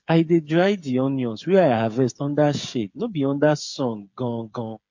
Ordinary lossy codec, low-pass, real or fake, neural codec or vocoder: MP3, 48 kbps; 7.2 kHz; fake; codec, 16 kHz, 8 kbps, FreqCodec, smaller model